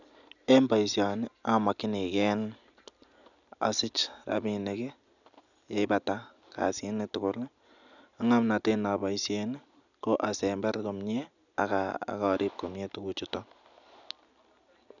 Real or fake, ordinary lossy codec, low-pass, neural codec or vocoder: real; none; 7.2 kHz; none